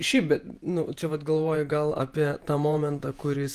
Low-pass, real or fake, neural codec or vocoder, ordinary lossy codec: 14.4 kHz; fake; vocoder, 48 kHz, 128 mel bands, Vocos; Opus, 32 kbps